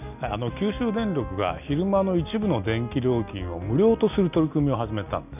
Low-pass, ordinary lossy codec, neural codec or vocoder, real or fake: 3.6 kHz; none; none; real